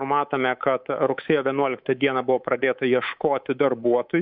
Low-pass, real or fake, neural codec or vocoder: 5.4 kHz; fake; codec, 24 kHz, 3.1 kbps, DualCodec